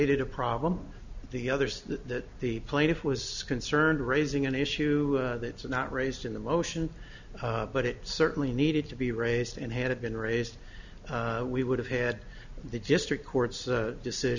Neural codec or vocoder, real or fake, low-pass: none; real; 7.2 kHz